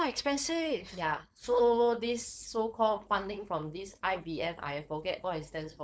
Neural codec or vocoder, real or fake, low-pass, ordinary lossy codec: codec, 16 kHz, 4.8 kbps, FACodec; fake; none; none